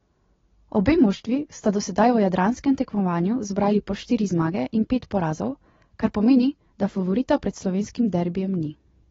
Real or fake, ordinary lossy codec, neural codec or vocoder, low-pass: real; AAC, 24 kbps; none; 7.2 kHz